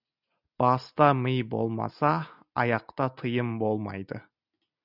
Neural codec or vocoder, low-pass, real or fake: none; 5.4 kHz; real